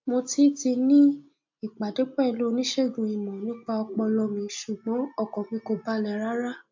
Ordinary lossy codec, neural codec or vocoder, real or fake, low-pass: MP3, 48 kbps; none; real; 7.2 kHz